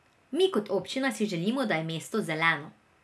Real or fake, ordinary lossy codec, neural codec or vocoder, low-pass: real; none; none; none